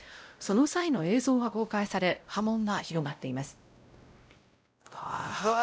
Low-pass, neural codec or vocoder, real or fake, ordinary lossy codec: none; codec, 16 kHz, 0.5 kbps, X-Codec, WavLM features, trained on Multilingual LibriSpeech; fake; none